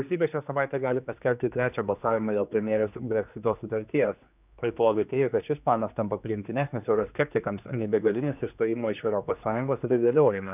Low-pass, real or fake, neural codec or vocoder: 3.6 kHz; fake; codec, 16 kHz, 2 kbps, X-Codec, HuBERT features, trained on general audio